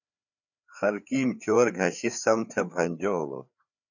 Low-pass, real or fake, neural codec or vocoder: 7.2 kHz; fake; codec, 16 kHz, 4 kbps, FreqCodec, larger model